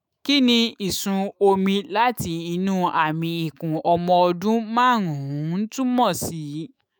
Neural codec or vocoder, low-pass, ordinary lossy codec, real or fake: autoencoder, 48 kHz, 128 numbers a frame, DAC-VAE, trained on Japanese speech; none; none; fake